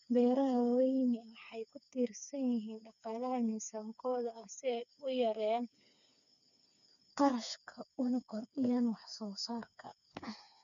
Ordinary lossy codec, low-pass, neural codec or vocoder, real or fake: none; 7.2 kHz; codec, 16 kHz, 4 kbps, FreqCodec, smaller model; fake